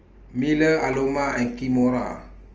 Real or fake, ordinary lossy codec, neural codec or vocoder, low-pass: real; Opus, 24 kbps; none; 7.2 kHz